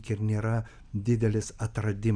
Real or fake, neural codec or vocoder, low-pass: real; none; 9.9 kHz